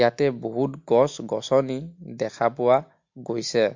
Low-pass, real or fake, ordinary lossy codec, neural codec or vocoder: 7.2 kHz; real; MP3, 48 kbps; none